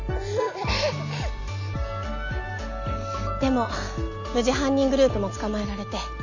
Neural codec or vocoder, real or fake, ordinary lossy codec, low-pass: none; real; none; 7.2 kHz